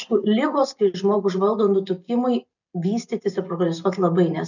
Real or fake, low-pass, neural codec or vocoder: real; 7.2 kHz; none